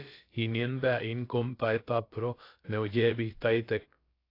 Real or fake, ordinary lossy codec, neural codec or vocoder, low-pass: fake; AAC, 32 kbps; codec, 16 kHz, about 1 kbps, DyCAST, with the encoder's durations; 5.4 kHz